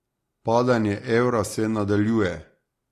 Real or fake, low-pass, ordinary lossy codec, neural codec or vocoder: real; 14.4 kHz; AAC, 48 kbps; none